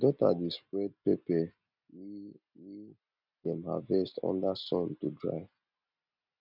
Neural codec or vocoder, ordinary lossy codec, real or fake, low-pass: none; none; real; 5.4 kHz